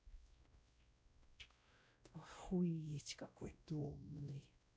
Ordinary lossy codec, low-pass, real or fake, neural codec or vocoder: none; none; fake; codec, 16 kHz, 0.5 kbps, X-Codec, WavLM features, trained on Multilingual LibriSpeech